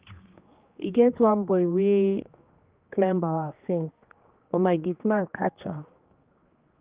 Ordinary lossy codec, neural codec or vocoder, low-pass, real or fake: Opus, 32 kbps; codec, 16 kHz, 2 kbps, X-Codec, HuBERT features, trained on general audio; 3.6 kHz; fake